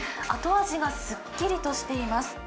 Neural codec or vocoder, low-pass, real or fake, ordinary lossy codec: none; none; real; none